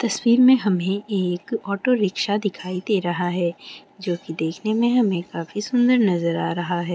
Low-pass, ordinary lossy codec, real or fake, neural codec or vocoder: none; none; real; none